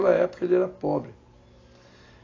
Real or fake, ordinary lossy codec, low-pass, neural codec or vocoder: real; AAC, 32 kbps; 7.2 kHz; none